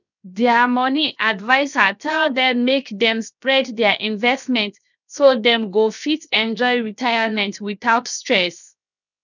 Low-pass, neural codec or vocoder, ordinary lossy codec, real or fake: 7.2 kHz; codec, 16 kHz, 0.7 kbps, FocalCodec; none; fake